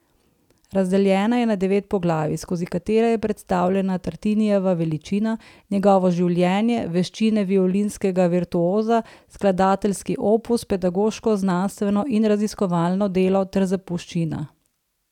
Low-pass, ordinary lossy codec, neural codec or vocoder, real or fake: 19.8 kHz; none; none; real